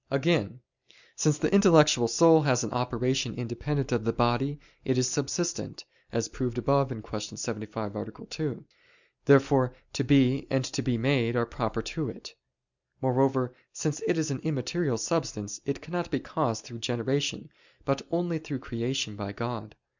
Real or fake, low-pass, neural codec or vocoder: real; 7.2 kHz; none